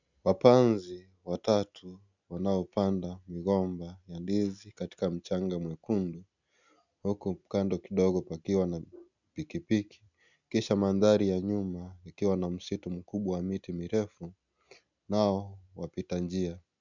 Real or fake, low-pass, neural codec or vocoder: real; 7.2 kHz; none